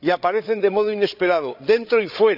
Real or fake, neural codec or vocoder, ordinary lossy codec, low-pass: fake; codec, 16 kHz, 8 kbps, FreqCodec, larger model; none; 5.4 kHz